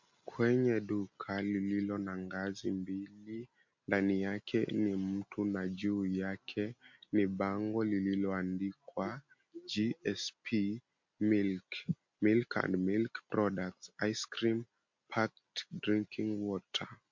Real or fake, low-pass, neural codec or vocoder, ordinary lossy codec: real; 7.2 kHz; none; MP3, 48 kbps